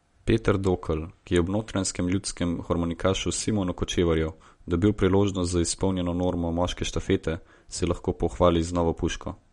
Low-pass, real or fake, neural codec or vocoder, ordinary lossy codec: 10.8 kHz; real; none; MP3, 48 kbps